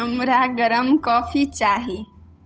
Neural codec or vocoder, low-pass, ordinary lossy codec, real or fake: codec, 16 kHz, 8 kbps, FunCodec, trained on Chinese and English, 25 frames a second; none; none; fake